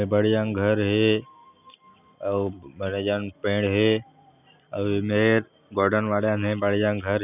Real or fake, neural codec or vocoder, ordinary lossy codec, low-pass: real; none; none; 3.6 kHz